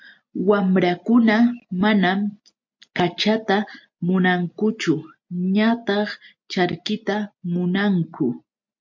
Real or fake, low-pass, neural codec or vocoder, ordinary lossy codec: real; 7.2 kHz; none; MP3, 48 kbps